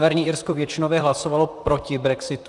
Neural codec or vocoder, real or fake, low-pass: vocoder, 44.1 kHz, 128 mel bands, Pupu-Vocoder; fake; 10.8 kHz